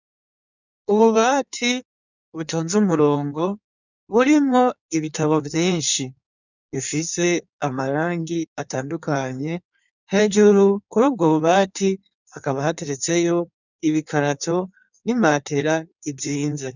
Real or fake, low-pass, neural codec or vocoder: fake; 7.2 kHz; codec, 16 kHz in and 24 kHz out, 1.1 kbps, FireRedTTS-2 codec